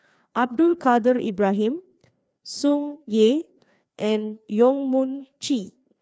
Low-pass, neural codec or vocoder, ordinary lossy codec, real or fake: none; codec, 16 kHz, 2 kbps, FreqCodec, larger model; none; fake